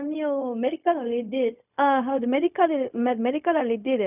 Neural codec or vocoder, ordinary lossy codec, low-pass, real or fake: codec, 16 kHz, 0.4 kbps, LongCat-Audio-Codec; none; 3.6 kHz; fake